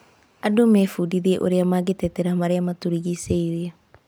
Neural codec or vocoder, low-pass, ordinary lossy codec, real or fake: none; none; none; real